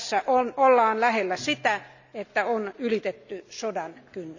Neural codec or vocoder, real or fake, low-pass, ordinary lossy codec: none; real; 7.2 kHz; none